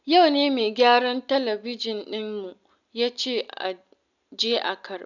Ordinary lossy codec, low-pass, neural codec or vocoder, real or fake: none; 7.2 kHz; none; real